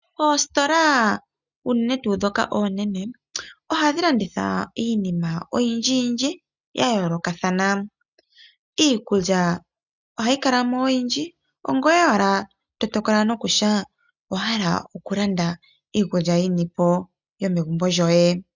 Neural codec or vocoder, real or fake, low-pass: none; real; 7.2 kHz